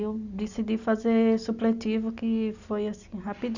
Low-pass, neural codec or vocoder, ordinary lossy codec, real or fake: 7.2 kHz; none; none; real